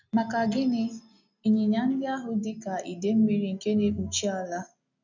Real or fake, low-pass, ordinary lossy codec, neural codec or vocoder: real; 7.2 kHz; none; none